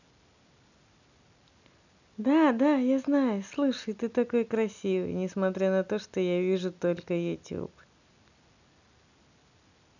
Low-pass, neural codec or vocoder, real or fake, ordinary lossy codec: 7.2 kHz; none; real; none